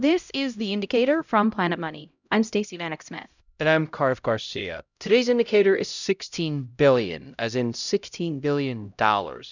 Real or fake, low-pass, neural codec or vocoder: fake; 7.2 kHz; codec, 16 kHz, 0.5 kbps, X-Codec, HuBERT features, trained on LibriSpeech